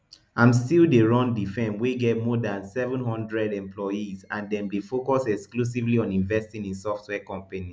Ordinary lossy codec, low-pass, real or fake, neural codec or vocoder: none; none; real; none